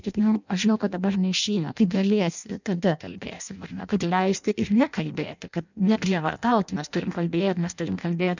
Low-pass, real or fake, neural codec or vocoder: 7.2 kHz; fake; codec, 16 kHz in and 24 kHz out, 0.6 kbps, FireRedTTS-2 codec